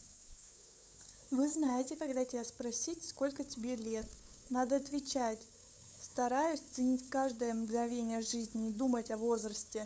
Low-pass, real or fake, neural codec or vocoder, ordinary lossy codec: none; fake; codec, 16 kHz, 8 kbps, FunCodec, trained on LibriTTS, 25 frames a second; none